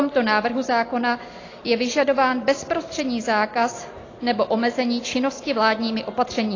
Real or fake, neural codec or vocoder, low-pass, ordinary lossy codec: real; none; 7.2 kHz; AAC, 32 kbps